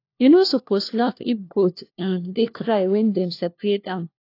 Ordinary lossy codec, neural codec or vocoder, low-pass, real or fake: AAC, 32 kbps; codec, 16 kHz, 1 kbps, FunCodec, trained on LibriTTS, 50 frames a second; 5.4 kHz; fake